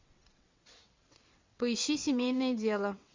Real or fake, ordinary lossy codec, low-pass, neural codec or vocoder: fake; MP3, 64 kbps; 7.2 kHz; vocoder, 22.05 kHz, 80 mel bands, WaveNeXt